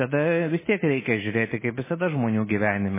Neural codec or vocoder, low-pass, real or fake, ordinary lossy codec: none; 3.6 kHz; real; MP3, 16 kbps